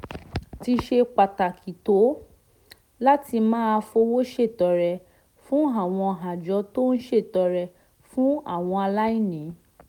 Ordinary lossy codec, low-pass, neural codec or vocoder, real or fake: none; 19.8 kHz; none; real